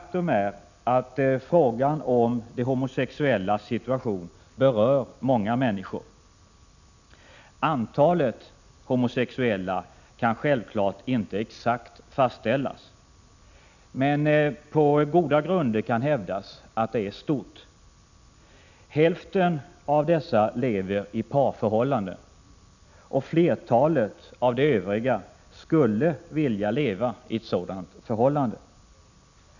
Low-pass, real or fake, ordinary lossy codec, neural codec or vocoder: 7.2 kHz; real; none; none